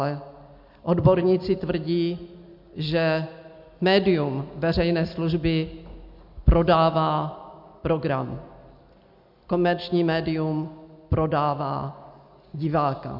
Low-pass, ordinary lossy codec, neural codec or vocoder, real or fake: 5.4 kHz; MP3, 48 kbps; none; real